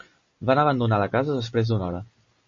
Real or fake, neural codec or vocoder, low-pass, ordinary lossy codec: real; none; 7.2 kHz; MP3, 32 kbps